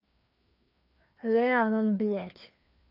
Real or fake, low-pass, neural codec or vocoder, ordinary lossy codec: fake; 5.4 kHz; codec, 16 kHz, 2 kbps, FreqCodec, larger model; none